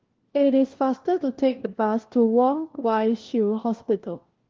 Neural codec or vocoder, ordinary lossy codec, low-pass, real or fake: codec, 16 kHz, 1 kbps, FunCodec, trained on LibriTTS, 50 frames a second; Opus, 16 kbps; 7.2 kHz; fake